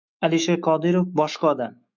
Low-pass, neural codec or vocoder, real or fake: 7.2 kHz; autoencoder, 48 kHz, 128 numbers a frame, DAC-VAE, trained on Japanese speech; fake